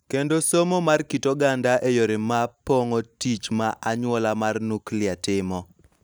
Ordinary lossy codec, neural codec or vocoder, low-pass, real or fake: none; none; none; real